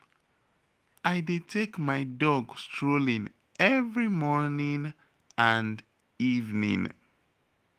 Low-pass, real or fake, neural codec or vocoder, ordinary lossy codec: 14.4 kHz; fake; autoencoder, 48 kHz, 128 numbers a frame, DAC-VAE, trained on Japanese speech; Opus, 24 kbps